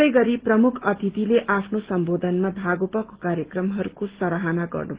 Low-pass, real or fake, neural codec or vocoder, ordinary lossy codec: 3.6 kHz; real; none; Opus, 16 kbps